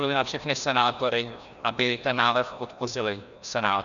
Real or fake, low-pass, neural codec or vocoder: fake; 7.2 kHz; codec, 16 kHz, 1 kbps, FreqCodec, larger model